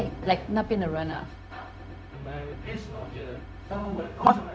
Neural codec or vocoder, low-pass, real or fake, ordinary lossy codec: codec, 16 kHz, 0.4 kbps, LongCat-Audio-Codec; none; fake; none